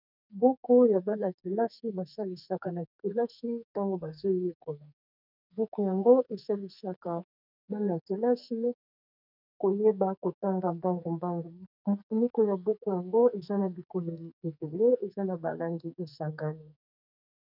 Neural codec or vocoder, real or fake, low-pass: codec, 44.1 kHz, 2.6 kbps, SNAC; fake; 5.4 kHz